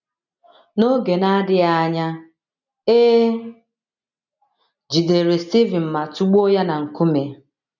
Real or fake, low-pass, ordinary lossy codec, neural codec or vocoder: real; 7.2 kHz; none; none